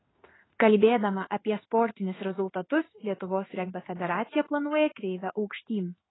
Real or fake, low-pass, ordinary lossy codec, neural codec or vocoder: fake; 7.2 kHz; AAC, 16 kbps; codec, 16 kHz in and 24 kHz out, 1 kbps, XY-Tokenizer